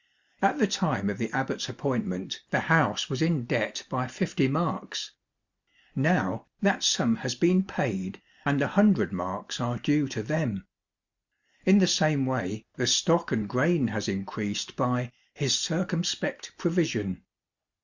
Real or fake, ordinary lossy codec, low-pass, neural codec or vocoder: real; Opus, 64 kbps; 7.2 kHz; none